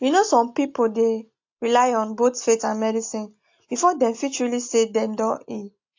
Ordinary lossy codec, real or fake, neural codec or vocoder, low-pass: AAC, 48 kbps; real; none; 7.2 kHz